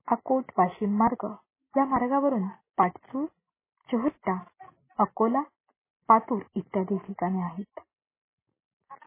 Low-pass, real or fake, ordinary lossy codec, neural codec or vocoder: 3.6 kHz; real; MP3, 16 kbps; none